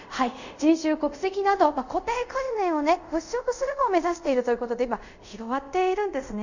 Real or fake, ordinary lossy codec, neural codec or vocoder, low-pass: fake; none; codec, 24 kHz, 0.5 kbps, DualCodec; 7.2 kHz